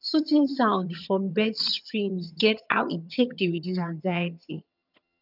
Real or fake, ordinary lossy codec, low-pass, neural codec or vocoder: fake; none; 5.4 kHz; vocoder, 22.05 kHz, 80 mel bands, HiFi-GAN